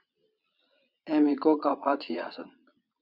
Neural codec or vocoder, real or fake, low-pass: vocoder, 22.05 kHz, 80 mel bands, Vocos; fake; 5.4 kHz